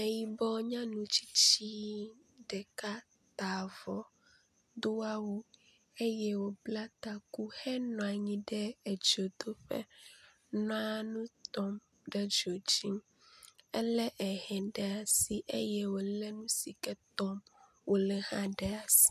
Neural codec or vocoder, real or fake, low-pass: none; real; 14.4 kHz